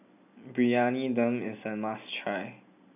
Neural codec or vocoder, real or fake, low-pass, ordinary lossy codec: none; real; 3.6 kHz; none